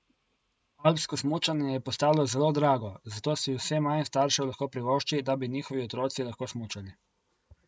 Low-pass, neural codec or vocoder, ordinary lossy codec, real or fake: none; none; none; real